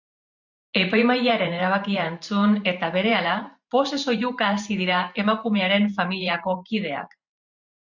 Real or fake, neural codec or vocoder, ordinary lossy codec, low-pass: fake; vocoder, 44.1 kHz, 128 mel bands every 512 samples, BigVGAN v2; MP3, 64 kbps; 7.2 kHz